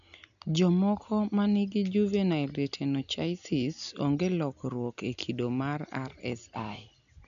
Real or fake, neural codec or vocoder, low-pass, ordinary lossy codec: real; none; 7.2 kHz; none